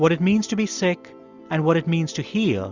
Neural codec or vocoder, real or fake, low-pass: none; real; 7.2 kHz